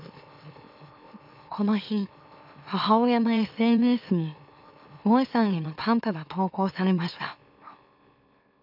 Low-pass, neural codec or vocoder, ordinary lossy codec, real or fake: 5.4 kHz; autoencoder, 44.1 kHz, a latent of 192 numbers a frame, MeloTTS; none; fake